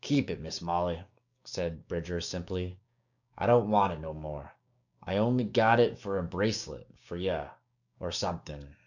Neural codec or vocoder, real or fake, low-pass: codec, 16 kHz, 6 kbps, DAC; fake; 7.2 kHz